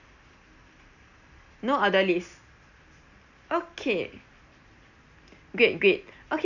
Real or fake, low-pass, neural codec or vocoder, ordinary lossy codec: real; 7.2 kHz; none; none